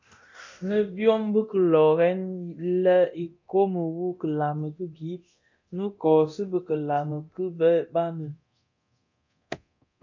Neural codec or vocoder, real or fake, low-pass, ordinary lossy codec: codec, 24 kHz, 0.9 kbps, DualCodec; fake; 7.2 kHz; MP3, 64 kbps